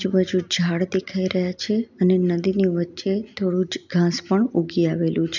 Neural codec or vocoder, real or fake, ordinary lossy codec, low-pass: none; real; none; 7.2 kHz